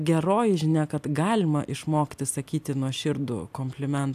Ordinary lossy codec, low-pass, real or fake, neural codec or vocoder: AAC, 96 kbps; 14.4 kHz; real; none